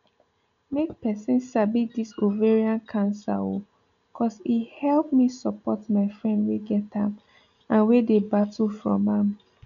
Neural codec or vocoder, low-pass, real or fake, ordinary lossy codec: none; 7.2 kHz; real; none